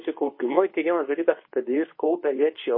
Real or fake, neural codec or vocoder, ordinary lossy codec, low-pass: fake; codec, 24 kHz, 0.9 kbps, WavTokenizer, medium speech release version 2; MP3, 24 kbps; 5.4 kHz